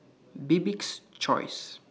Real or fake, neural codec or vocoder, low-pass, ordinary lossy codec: real; none; none; none